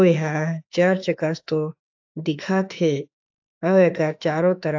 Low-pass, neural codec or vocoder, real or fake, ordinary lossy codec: 7.2 kHz; autoencoder, 48 kHz, 32 numbers a frame, DAC-VAE, trained on Japanese speech; fake; AAC, 48 kbps